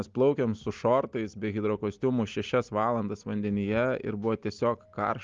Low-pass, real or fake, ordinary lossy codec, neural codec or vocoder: 7.2 kHz; real; Opus, 32 kbps; none